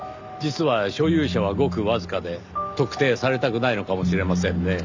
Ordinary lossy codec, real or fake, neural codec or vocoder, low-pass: MP3, 64 kbps; real; none; 7.2 kHz